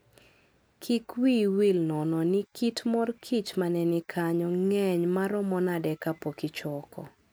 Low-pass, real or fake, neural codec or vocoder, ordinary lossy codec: none; real; none; none